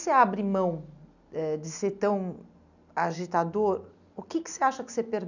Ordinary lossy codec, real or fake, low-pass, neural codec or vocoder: none; real; 7.2 kHz; none